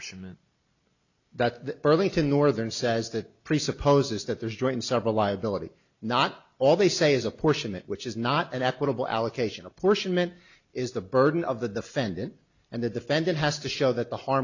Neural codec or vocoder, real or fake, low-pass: none; real; 7.2 kHz